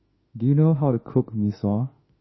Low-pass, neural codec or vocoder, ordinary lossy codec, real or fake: 7.2 kHz; autoencoder, 48 kHz, 32 numbers a frame, DAC-VAE, trained on Japanese speech; MP3, 24 kbps; fake